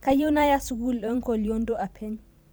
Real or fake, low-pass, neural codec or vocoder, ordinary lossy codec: fake; none; vocoder, 44.1 kHz, 128 mel bands every 512 samples, BigVGAN v2; none